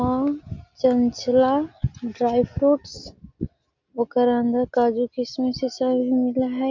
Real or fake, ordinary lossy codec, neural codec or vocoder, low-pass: real; MP3, 64 kbps; none; 7.2 kHz